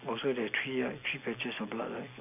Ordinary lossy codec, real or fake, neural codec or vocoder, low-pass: none; real; none; 3.6 kHz